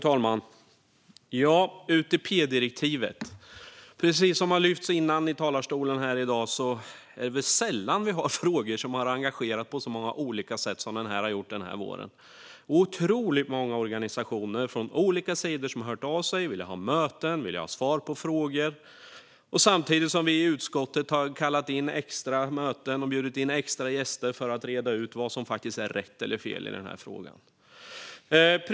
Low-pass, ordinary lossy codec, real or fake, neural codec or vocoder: none; none; real; none